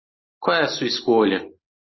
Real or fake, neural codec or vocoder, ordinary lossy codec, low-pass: real; none; MP3, 24 kbps; 7.2 kHz